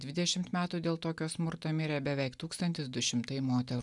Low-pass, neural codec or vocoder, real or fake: 10.8 kHz; none; real